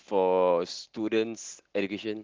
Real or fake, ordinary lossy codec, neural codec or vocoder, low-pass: real; Opus, 16 kbps; none; 7.2 kHz